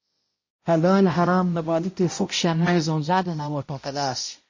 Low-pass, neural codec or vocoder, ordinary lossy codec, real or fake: 7.2 kHz; codec, 16 kHz, 0.5 kbps, X-Codec, HuBERT features, trained on balanced general audio; MP3, 32 kbps; fake